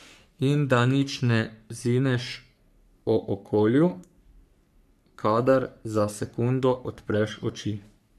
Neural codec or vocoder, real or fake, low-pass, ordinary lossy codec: codec, 44.1 kHz, 3.4 kbps, Pupu-Codec; fake; 14.4 kHz; none